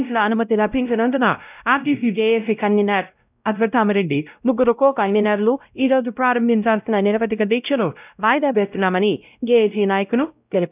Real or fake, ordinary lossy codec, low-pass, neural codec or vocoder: fake; none; 3.6 kHz; codec, 16 kHz, 0.5 kbps, X-Codec, WavLM features, trained on Multilingual LibriSpeech